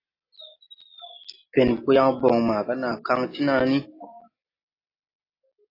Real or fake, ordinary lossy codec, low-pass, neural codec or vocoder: real; AAC, 32 kbps; 5.4 kHz; none